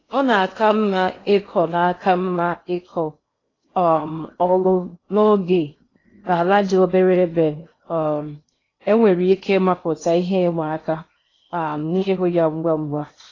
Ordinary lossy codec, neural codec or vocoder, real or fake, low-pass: AAC, 32 kbps; codec, 16 kHz in and 24 kHz out, 0.8 kbps, FocalCodec, streaming, 65536 codes; fake; 7.2 kHz